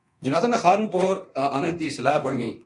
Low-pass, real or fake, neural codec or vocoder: 10.8 kHz; fake; codec, 24 kHz, 0.9 kbps, DualCodec